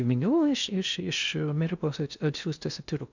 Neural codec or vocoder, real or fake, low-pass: codec, 16 kHz in and 24 kHz out, 0.6 kbps, FocalCodec, streaming, 2048 codes; fake; 7.2 kHz